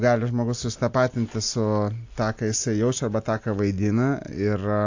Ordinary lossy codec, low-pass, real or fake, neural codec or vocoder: AAC, 48 kbps; 7.2 kHz; real; none